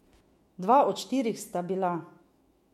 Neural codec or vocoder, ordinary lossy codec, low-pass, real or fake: autoencoder, 48 kHz, 128 numbers a frame, DAC-VAE, trained on Japanese speech; MP3, 64 kbps; 19.8 kHz; fake